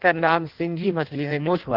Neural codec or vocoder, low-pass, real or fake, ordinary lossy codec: codec, 16 kHz in and 24 kHz out, 0.6 kbps, FireRedTTS-2 codec; 5.4 kHz; fake; Opus, 16 kbps